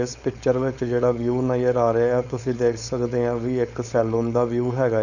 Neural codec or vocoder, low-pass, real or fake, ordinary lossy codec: codec, 16 kHz, 4.8 kbps, FACodec; 7.2 kHz; fake; none